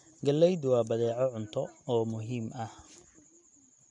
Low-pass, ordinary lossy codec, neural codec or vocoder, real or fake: 10.8 kHz; MP3, 64 kbps; none; real